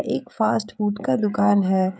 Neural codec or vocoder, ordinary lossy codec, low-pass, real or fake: codec, 16 kHz, 16 kbps, FreqCodec, smaller model; none; none; fake